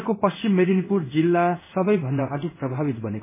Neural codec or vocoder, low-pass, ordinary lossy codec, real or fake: codec, 16 kHz in and 24 kHz out, 1 kbps, XY-Tokenizer; 3.6 kHz; MP3, 16 kbps; fake